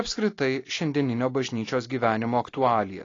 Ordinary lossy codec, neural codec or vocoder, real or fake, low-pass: AAC, 32 kbps; none; real; 7.2 kHz